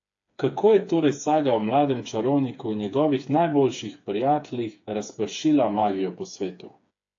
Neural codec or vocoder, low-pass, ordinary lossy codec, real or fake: codec, 16 kHz, 4 kbps, FreqCodec, smaller model; 7.2 kHz; AAC, 48 kbps; fake